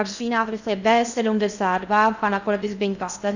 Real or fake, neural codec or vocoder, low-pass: fake; codec, 16 kHz in and 24 kHz out, 0.6 kbps, FocalCodec, streaming, 2048 codes; 7.2 kHz